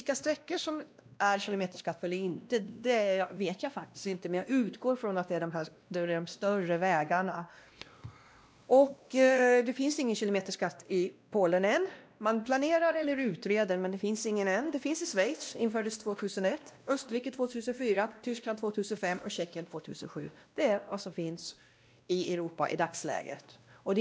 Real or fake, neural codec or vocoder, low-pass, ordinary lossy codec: fake; codec, 16 kHz, 1 kbps, X-Codec, WavLM features, trained on Multilingual LibriSpeech; none; none